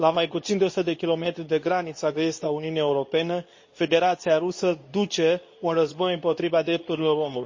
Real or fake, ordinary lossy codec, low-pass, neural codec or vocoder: fake; MP3, 32 kbps; 7.2 kHz; codec, 24 kHz, 0.9 kbps, WavTokenizer, medium speech release version 2